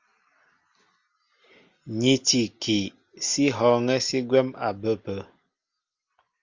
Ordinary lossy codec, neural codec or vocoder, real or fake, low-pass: Opus, 32 kbps; none; real; 7.2 kHz